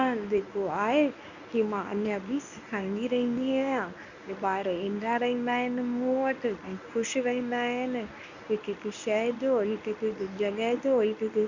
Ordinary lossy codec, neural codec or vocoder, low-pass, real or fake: none; codec, 24 kHz, 0.9 kbps, WavTokenizer, medium speech release version 1; 7.2 kHz; fake